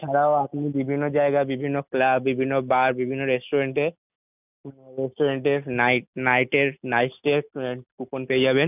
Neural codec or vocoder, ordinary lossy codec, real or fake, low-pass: none; none; real; 3.6 kHz